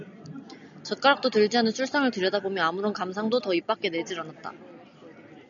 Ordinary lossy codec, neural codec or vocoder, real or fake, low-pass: MP3, 96 kbps; none; real; 7.2 kHz